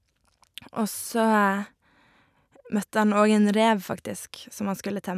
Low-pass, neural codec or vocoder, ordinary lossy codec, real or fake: 14.4 kHz; none; none; real